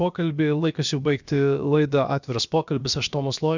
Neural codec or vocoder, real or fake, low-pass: codec, 16 kHz, about 1 kbps, DyCAST, with the encoder's durations; fake; 7.2 kHz